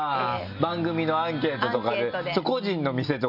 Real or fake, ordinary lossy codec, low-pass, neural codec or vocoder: real; none; 5.4 kHz; none